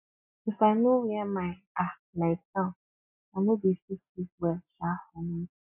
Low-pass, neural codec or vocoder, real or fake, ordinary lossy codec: 3.6 kHz; none; real; none